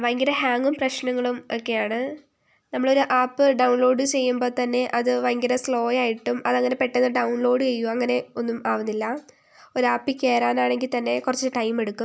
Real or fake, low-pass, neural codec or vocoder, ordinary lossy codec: real; none; none; none